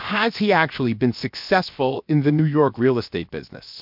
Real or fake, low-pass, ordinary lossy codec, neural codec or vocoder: fake; 5.4 kHz; MP3, 48 kbps; codec, 16 kHz, 0.7 kbps, FocalCodec